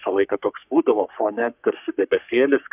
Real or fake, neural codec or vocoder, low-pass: fake; codec, 44.1 kHz, 3.4 kbps, Pupu-Codec; 3.6 kHz